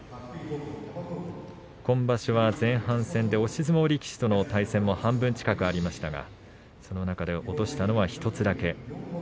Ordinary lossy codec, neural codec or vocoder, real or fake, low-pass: none; none; real; none